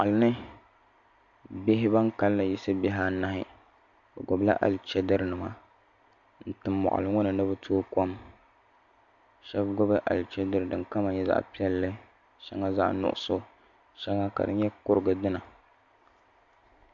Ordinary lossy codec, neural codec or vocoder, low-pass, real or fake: AAC, 64 kbps; none; 7.2 kHz; real